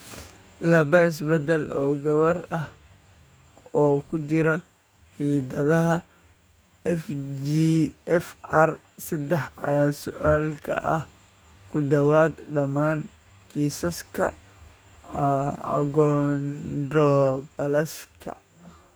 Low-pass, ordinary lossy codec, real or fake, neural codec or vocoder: none; none; fake; codec, 44.1 kHz, 2.6 kbps, DAC